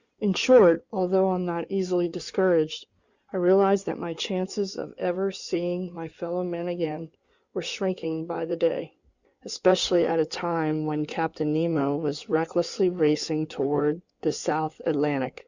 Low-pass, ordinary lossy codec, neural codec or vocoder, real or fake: 7.2 kHz; Opus, 64 kbps; codec, 16 kHz in and 24 kHz out, 2.2 kbps, FireRedTTS-2 codec; fake